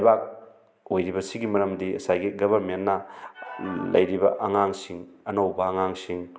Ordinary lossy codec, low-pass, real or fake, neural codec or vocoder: none; none; real; none